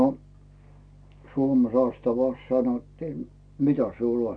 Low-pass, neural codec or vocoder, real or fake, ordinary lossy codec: 9.9 kHz; none; real; none